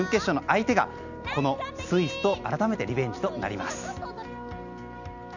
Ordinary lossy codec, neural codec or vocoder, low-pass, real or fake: none; none; 7.2 kHz; real